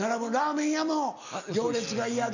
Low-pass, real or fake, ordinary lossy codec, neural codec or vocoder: 7.2 kHz; real; none; none